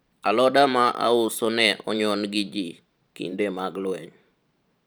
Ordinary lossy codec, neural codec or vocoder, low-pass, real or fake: none; vocoder, 44.1 kHz, 128 mel bands every 512 samples, BigVGAN v2; none; fake